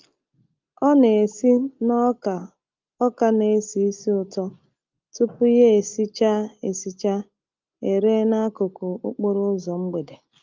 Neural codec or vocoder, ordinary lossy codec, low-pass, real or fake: none; Opus, 32 kbps; 7.2 kHz; real